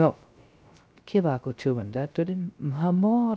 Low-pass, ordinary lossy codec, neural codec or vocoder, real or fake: none; none; codec, 16 kHz, 0.3 kbps, FocalCodec; fake